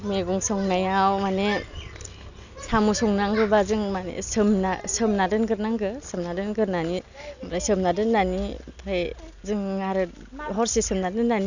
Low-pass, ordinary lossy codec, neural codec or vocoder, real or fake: 7.2 kHz; none; none; real